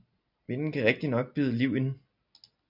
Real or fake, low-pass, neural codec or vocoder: real; 5.4 kHz; none